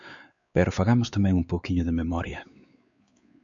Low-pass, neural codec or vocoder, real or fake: 7.2 kHz; codec, 16 kHz, 4 kbps, X-Codec, WavLM features, trained on Multilingual LibriSpeech; fake